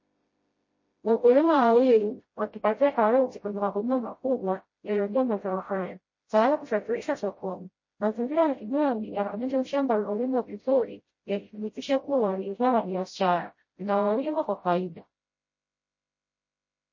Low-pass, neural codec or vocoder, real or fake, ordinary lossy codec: 7.2 kHz; codec, 16 kHz, 0.5 kbps, FreqCodec, smaller model; fake; MP3, 32 kbps